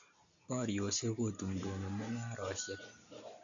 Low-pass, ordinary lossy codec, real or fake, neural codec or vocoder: 7.2 kHz; none; real; none